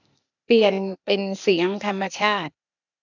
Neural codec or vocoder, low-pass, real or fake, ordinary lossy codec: codec, 16 kHz, 0.8 kbps, ZipCodec; 7.2 kHz; fake; none